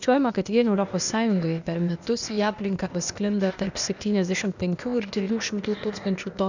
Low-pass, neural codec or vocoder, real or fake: 7.2 kHz; codec, 16 kHz, 0.8 kbps, ZipCodec; fake